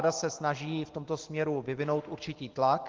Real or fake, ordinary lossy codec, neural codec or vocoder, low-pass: real; Opus, 16 kbps; none; 7.2 kHz